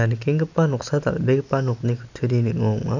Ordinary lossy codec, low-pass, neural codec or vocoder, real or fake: none; 7.2 kHz; none; real